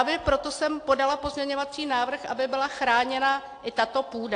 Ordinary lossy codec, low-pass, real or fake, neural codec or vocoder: AAC, 48 kbps; 9.9 kHz; real; none